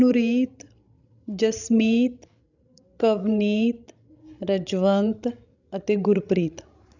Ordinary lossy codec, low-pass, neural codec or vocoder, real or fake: none; 7.2 kHz; codec, 16 kHz, 16 kbps, FreqCodec, larger model; fake